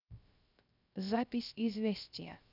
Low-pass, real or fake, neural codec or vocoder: 5.4 kHz; fake; codec, 16 kHz, 0.3 kbps, FocalCodec